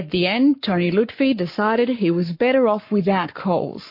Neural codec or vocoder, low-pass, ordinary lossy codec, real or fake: codec, 44.1 kHz, 7.8 kbps, Pupu-Codec; 5.4 kHz; MP3, 32 kbps; fake